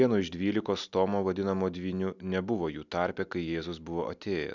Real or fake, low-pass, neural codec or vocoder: real; 7.2 kHz; none